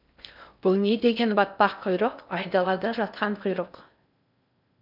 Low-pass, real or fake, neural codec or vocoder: 5.4 kHz; fake; codec, 16 kHz in and 24 kHz out, 0.6 kbps, FocalCodec, streaming, 4096 codes